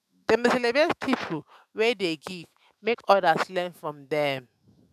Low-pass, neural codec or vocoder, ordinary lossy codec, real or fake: 14.4 kHz; autoencoder, 48 kHz, 128 numbers a frame, DAC-VAE, trained on Japanese speech; none; fake